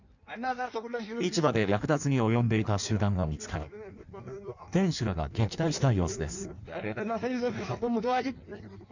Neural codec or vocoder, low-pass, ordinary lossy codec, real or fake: codec, 16 kHz in and 24 kHz out, 1.1 kbps, FireRedTTS-2 codec; 7.2 kHz; none; fake